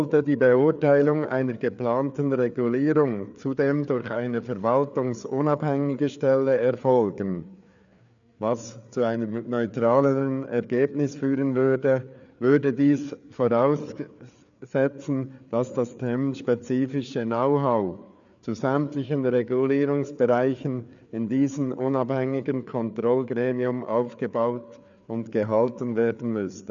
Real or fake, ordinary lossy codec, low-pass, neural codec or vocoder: fake; none; 7.2 kHz; codec, 16 kHz, 4 kbps, FreqCodec, larger model